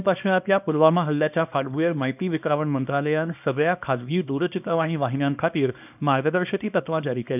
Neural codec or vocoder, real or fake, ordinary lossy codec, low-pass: codec, 24 kHz, 0.9 kbps, WavTokenizer, small release; fake; none; 3.6 kHz